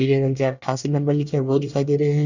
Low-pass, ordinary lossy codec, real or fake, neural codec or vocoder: 7.2 kHz; none; fake; codec, 24 kHz, 1 kbps, SNAC